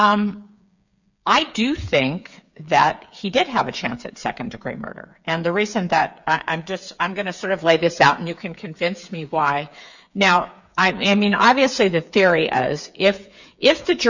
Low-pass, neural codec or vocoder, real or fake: 7.2 kHz; codec, 16 kHz, 8 kbps, FreqCodec, smaller model; fake